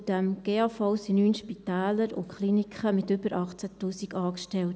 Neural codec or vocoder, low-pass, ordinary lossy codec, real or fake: none; none; none; real